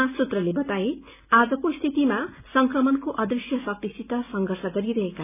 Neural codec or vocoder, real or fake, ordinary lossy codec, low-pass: none; real; none; 3.6 kHz